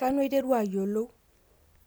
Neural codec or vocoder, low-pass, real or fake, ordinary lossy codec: none; none; real; none